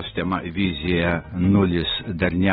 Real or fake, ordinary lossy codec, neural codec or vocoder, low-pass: real; AAC, 16 kbps; none; 19.8 kHz